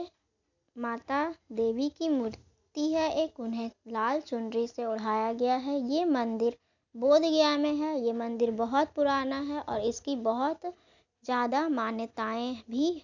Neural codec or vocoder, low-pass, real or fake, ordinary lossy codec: none; 7.2 kHz; real; none